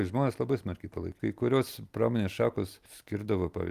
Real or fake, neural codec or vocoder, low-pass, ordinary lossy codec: real; none; 14.4 kHz; Opus, 32 kbps